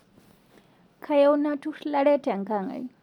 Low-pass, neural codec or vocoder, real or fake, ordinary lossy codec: 19.8 kHz; none; real; none